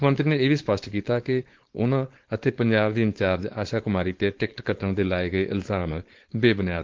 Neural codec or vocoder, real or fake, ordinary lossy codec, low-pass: codec, 16 kHz, 4.8 kbps, FACodec; fake; Opus, 16 kbps; 7.2 kHz